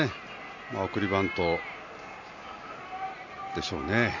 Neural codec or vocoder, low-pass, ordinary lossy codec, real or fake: none; 7.2 kHz; none; real